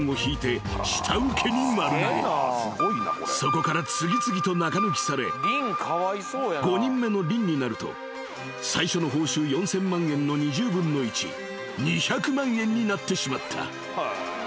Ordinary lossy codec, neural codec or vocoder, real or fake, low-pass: none; none; real; none